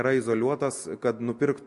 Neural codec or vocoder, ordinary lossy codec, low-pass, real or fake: none; MP3, 48 kbps; 14.4 kHz; real